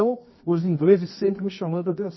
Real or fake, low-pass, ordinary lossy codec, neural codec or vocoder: fake; 7.2 kHz; MP3, 24 kbps; codec, 24 kHz, 0.9 kbps, WavTokenizer, medium music audio release